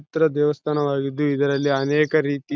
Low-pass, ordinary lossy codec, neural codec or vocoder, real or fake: none; none; none; real